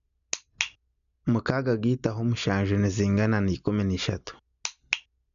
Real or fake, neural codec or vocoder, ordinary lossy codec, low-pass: real; none; none; 7.2 kHz